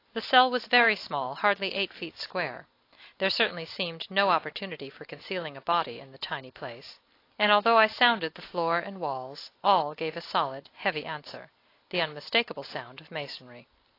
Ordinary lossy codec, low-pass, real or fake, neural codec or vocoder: AAC, 32 kbps; 5.4 kHz; real; none